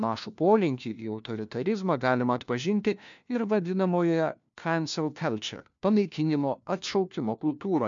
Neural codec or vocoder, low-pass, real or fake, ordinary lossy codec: codec, 16 kHz, 1 kbps, FunCodec, trained on LibriTTS, 50 frames a second; 7.2 kHz; fake; MP3, 64 kbps